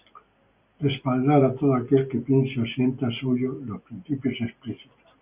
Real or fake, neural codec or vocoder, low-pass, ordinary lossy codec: real; none; 3.6 kHz; Opus, 64 kbps